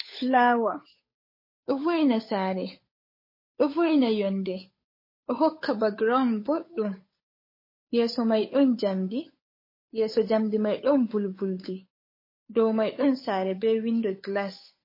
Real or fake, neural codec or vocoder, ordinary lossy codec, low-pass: fake; codec, 16 kHz, 8 kbps, FunCodec, trained on Chinese and English, 25 frames a second; MP3, 24 kbps; 5.4 kHz